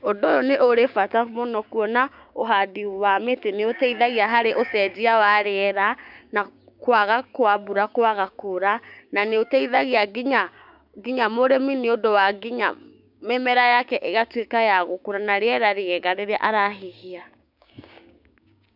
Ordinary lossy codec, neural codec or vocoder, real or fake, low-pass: none; codec, 16 kHz, 6 kbps, DAC; fake; 5.4 kHz